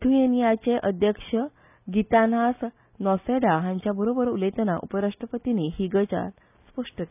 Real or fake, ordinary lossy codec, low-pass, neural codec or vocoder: real; none; 3.6 kHz; none